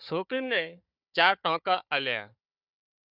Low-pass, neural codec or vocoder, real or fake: 5.4 kHz; codec, 16 kHz, 4 kbps, FunCodec, trained on Chinese and English, 50 frames a second; fake